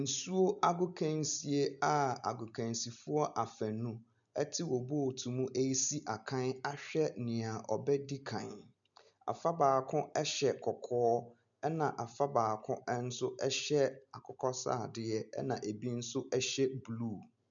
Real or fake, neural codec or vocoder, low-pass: real; none; 7.2 kHz